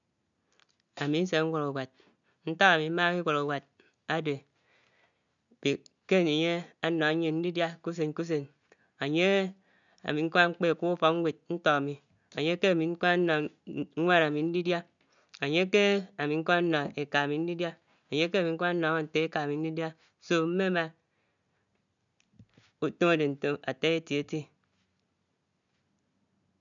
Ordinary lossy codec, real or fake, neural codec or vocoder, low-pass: none; real; none; 7.2 kHz